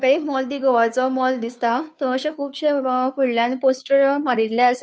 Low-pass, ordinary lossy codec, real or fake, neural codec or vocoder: none; none; fake; codec, 16 kHz, 2 kbps, FunCodec, trained on Chinese and English, 25 frames a second